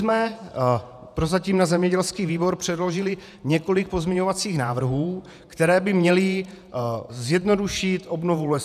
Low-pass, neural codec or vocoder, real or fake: 14.4 kHz; vocoder, 48 kHz, 128 mel bands, Vocos; fake